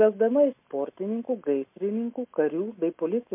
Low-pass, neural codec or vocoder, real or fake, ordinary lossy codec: 3.6 kHz; none; real; MP3, 32 kbps